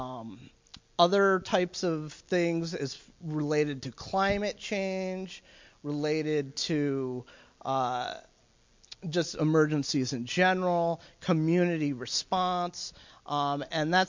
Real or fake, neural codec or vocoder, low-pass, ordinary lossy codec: real; none; 7.2 kHz; MP3, 48 kbps